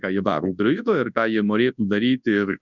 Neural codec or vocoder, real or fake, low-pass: codec, 24 kHz, 0.9 kbps, WavTokenizer, large speech release; fake; 7.2 kHz